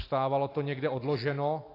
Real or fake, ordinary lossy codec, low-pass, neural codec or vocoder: real; AAC, 32 kbps; 5.4 kHz; none